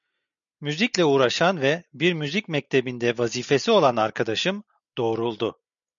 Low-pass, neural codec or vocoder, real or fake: 7.2 kHz; none; real